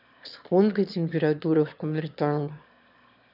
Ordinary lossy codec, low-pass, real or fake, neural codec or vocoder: none; 5.4 kHz; fake; autoencoder, 22.05 kHz, a latent of 192 numbers a frame, VITS, trained on one speaker